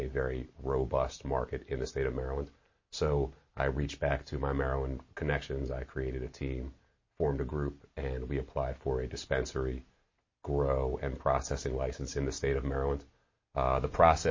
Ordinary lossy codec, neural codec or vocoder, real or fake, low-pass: MP3, 32 kbps; none; real; 7.2 kHz